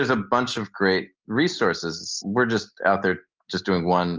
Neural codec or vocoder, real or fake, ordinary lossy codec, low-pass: none; real; Opus, 24 kbps; 7.2 kHz